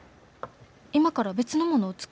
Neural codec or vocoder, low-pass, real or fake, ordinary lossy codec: none; none; real; none